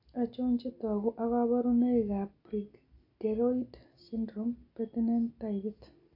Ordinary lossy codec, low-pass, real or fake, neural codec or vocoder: AAC, 32 kbps; 5.4 kHz; real; none